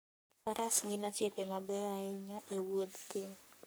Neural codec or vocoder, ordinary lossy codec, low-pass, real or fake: codec, 44.1 kHz, 3.4 kbps, Pupu-Codec; none; none; fake